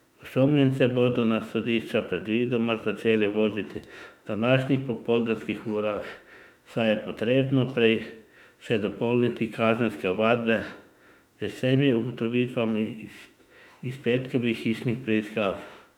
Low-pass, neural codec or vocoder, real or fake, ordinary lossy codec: 19.8 kHz; autoencoder, 48 kHz, 32 numbers a frame, DAC-VAE, trained on Japanese speech; fake; none